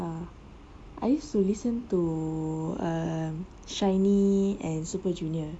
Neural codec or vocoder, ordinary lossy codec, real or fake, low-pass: none; none; real; 9.9 kHz